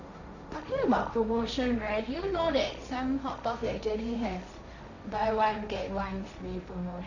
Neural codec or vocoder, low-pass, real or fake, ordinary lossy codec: codec, 16 kHz, 1.1 kbps, Voila-Tokenizer; 7.2 kHz; fake; none